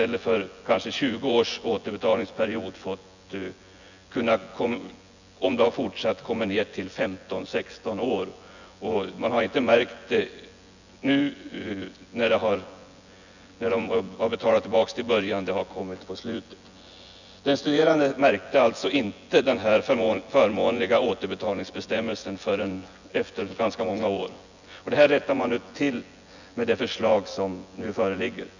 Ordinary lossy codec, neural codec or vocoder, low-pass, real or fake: none; vocoder, 24 kHz, 100 mel bands, Vocos; 7.2 kHz; fake